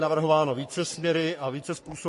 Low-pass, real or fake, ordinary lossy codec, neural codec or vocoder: 14.4 kHz; fake; MP3, 48 kbps; codec, 44.1 kHz, 3.4 kbps, Pupu-Codec